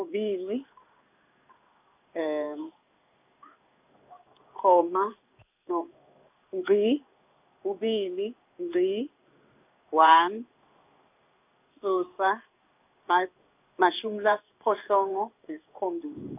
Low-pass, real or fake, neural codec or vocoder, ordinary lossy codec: 3.6 kHz; fake; codec, 16 kHz in and 24 kHz out, 1 kbps, XY-Tokenizer; none